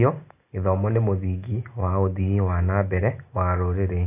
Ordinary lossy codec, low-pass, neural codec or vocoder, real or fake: AAC, 24 kbps; 3.6 kHz; none; real